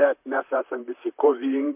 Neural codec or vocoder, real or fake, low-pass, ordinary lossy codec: vocoder, 44.1 kHz, 128 mel bands, Pupu-Vocoder; fake; 3.6 kHz; MP3, 32 kbps